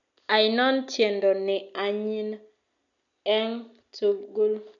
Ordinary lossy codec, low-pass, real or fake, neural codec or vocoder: none; 7.2 kHz; real; none